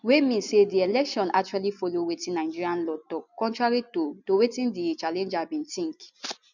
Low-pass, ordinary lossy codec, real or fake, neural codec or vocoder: 7.2 kHz; none; real; none